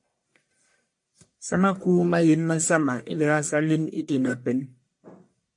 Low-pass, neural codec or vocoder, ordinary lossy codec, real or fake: 10.8 kHz; codec, 44.1 kHz, 1.7 kbps, Pupu-Codec; MP3, 48 kbps; fake